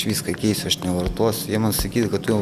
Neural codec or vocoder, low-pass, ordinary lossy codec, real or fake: none; 14.4 kHz; Opus, 64 kbps; real